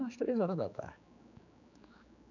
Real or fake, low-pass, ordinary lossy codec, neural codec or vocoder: fake; 7.2 kHz; none; codec, 16 kHz, 4 kbps, X-Codec, HuBERT features, trained on general audio